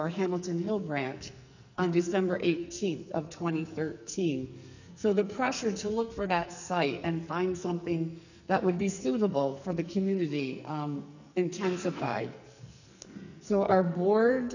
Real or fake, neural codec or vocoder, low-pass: fake; codec, 44.1 kHz, 2.6 kbps, SNAC; 7.2 kHz